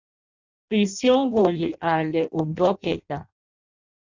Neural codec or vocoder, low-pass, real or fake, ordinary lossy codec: codec, 16 kHz in and 24 kHz out, 0.6 kbps, FireRedTTS-2 codec; 7.2 kHz; fake; Opus, 64 kbps